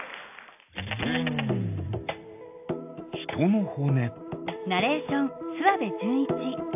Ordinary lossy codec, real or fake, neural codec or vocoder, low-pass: AAC, 32 kbps; real; none; 3.6 kHz